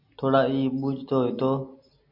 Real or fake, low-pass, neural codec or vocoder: real; 5.4 kHz; none